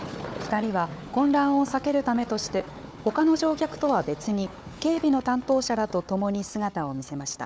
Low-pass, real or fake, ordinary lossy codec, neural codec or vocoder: none; fake; none; codec, 16 kHz, 4 kbps, FunCodec, trained on Chinese and English, 50 frames a second